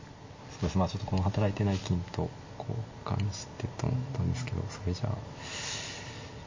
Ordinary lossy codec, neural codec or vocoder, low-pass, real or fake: MP3, 32 kbps; none; 7.2 kHz; real